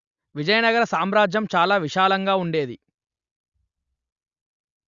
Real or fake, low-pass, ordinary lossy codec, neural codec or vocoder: real; 7.2 kHz; Opus, 64 kbps; none